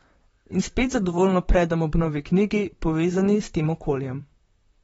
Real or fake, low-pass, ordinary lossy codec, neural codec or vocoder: fake; 19.8 kHz; AAC, 24 kbps; vocoder, 44.1 kHz, 128 mel bands, Pupu-Vocoder